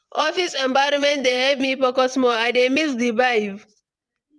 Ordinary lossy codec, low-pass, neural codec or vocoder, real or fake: none; none; vocoder, 22.05 kHz, 80 mel bands, WaveNeXt; fake